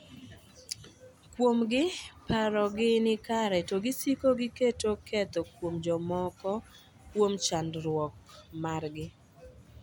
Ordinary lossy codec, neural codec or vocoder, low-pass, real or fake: MP3, 96 kbps; none; 19.8 kHz; real